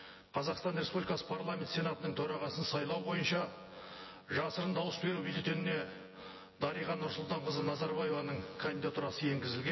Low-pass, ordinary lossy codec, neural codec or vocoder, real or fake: 7.2 kHz; MP3, 24 kbps; vocoder, 24 kHz, 100 mel bands, Vocos; fake